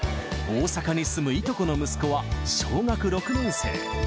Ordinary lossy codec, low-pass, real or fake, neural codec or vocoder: none; none; real; none